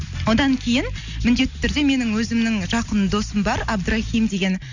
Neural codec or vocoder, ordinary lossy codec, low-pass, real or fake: none; none; 7.2 kHz; real